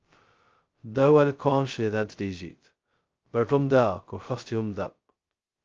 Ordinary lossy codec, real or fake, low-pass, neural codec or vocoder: Opus, 24 kbps; fake; 7.2 kHz; codec, 16 kHz, 0.2 kbps, FocalCodec